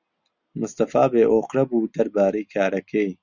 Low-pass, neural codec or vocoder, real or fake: 7.2 kHz; none; real